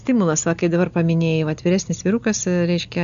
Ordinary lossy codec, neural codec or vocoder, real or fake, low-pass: AAC, 96 kbps; none; real; 7.2 kHz